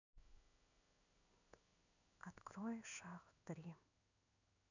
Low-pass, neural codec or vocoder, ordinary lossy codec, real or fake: 7.2 kHz; codec, 16 kHz in and 24 kHz out, 1 kbps, XY-Tokenizer; none; fake